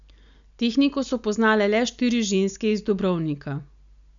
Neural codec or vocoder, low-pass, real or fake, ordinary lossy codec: none; 7.2 kHz; real; none